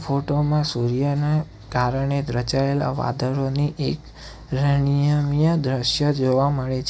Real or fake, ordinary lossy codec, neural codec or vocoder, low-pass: real; none; none; none